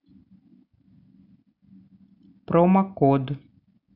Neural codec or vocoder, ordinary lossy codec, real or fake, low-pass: none; none; real; 5.4 kHz